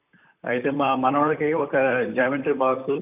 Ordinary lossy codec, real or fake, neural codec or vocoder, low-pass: none; fake; vocoder, 44.1 kHz, 128 mel bands, Pupu-Vocoder; 3.6 kHz